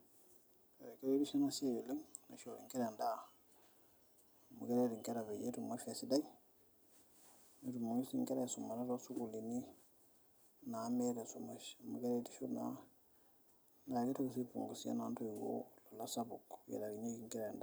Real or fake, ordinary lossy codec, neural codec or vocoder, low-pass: fake; none; vocoder, 44.1 kHz, 128 mel bands every 256 samples, BigVGAN v2; none